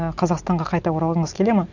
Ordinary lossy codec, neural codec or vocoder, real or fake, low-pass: none; none; real; 7.2 kHz